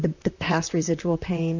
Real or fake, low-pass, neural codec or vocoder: fake; 7.2 kHz; vocoder, 44.1 kHz, 128 mel bands, Pupu-Vocoder